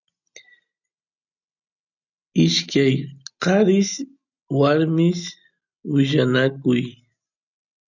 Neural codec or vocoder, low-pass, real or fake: none; 7.2 kHz; real